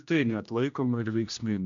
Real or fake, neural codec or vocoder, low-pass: fake; codec, 16 kHz, 1 kbps, X-Codec, HuBERT features, trained on general audio; 7.2 kHz